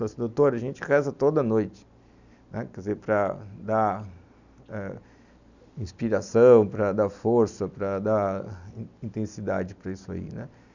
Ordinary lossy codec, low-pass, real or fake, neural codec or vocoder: none; 7.2 kHz; real; none